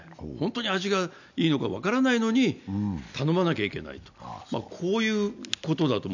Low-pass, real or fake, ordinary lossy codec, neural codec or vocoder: 7.2 kHz; real; none; none